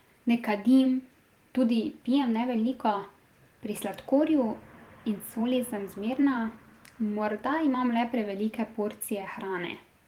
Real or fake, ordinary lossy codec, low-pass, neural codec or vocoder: fake; Opus, 24 kbps; 19.8 kHz; vocoder, 44.1 kHz, 128 mel bands every 512 samples, BigVGAN v2